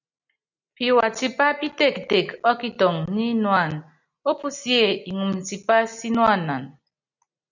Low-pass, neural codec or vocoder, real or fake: 7.2 kHz; none; real